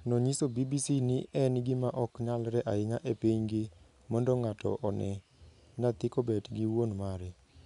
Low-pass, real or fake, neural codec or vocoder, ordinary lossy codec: 10.8 kHz; real; none; none